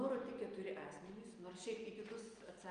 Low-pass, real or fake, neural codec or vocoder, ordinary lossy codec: 9.9 kHz; real; none; Opus, 32 kbps